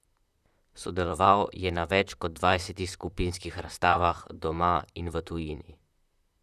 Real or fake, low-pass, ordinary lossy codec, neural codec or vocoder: fake; 14.4 kHz; none; vocoder, 44.1 kHz, 128 mel bands, Pupu-Vocoder